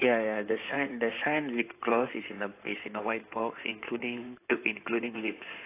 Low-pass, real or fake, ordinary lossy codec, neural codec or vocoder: 3.6 kHz; fake; AAC, 24 kbps; codec, 16 kHz in and 24 kHz out, 2.2 kbps, FireRedTTS-2 codec